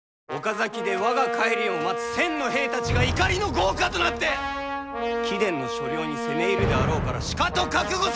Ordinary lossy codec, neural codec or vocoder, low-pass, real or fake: none; none; none; real